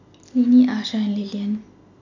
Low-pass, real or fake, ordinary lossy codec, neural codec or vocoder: 7.2 kHz; real; none; none